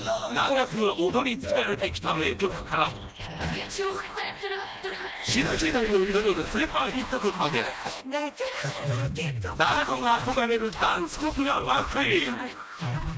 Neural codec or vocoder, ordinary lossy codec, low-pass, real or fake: codec, 16 kHz, 1 kbps, FreqCodec, smaller model; none; none; fake